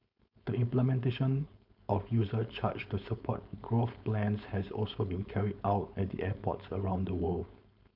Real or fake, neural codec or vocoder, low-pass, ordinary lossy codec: fake; codec, 16 kHz, 4.8 kbps, FACodec; 5.4 kHz; none